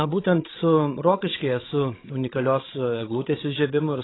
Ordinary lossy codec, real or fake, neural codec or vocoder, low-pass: AAC, 16 kbps; fake; codec, 16 kHz, 16 kbps, FreqCodec, larger model; 7.2 kHz